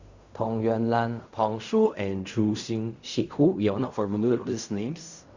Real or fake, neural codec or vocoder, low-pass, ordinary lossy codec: fake; codec, 16 kHz in and 24 kHz out, 0.4 kbps, LongCat-Audio-Codec, fine tuned four codebook decoder; 7.2 kHz; Opus, 64 kbps